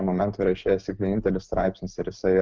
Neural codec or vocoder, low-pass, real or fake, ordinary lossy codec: none; 7.2 kHz; real; Opus, 24 kbps